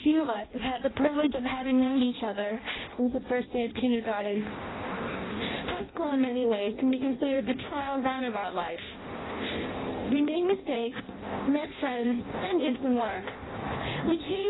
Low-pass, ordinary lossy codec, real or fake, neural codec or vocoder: 7.2 kHz; AAC, 16 kbps; fake; codec, 16 kHz in and 24 kHz out, 0.6 kbps, FireRedTTS-2 codec